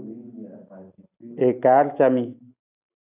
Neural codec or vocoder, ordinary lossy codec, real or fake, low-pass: none; AAC, 32 kbps; real; 3.6 kHz